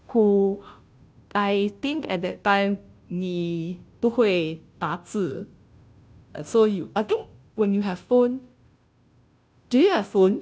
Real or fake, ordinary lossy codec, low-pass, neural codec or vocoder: fake; none; none; codec, 16 kHz, 0.5 kbps, FunCodec, trained on Chinese and English, 25 frames a second